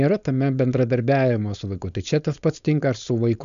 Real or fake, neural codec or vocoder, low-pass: fake; codec, 16 kHz, 4.8 kbps, FACodec; 7.2 kHz